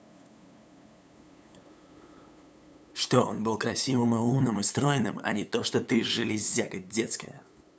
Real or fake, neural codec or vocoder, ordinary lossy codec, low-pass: fake; codec, 16 kHz, 8 kbps, FunCodec, trained on LibriTTS, 25 frames a second; none; none